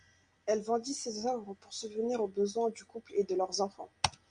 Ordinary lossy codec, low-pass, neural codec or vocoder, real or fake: Opus, 64 kbps; 9.9 kHz; none; real